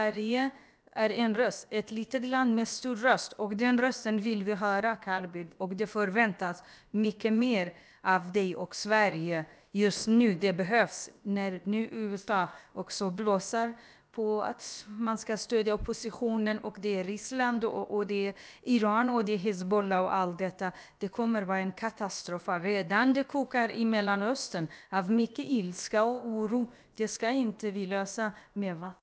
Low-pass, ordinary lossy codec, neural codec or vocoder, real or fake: none; none; codec, 16 kHz, about 1 kbps, DyCAST, with the encoder's durations; fake